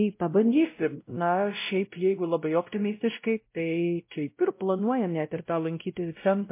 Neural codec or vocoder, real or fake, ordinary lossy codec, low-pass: codec, 16 kHz, 0.5 kbps, X-Codec, WavLM features, trained on Multilingual LibriSpeech; fake; MP3, 24 kbps; 3.6 kHz